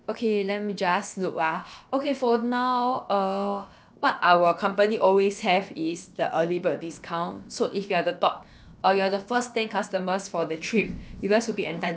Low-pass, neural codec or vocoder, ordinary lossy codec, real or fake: none; codec, 16 kHz, about 1 kbps, DyCAST, with the encoder's durations; none; fake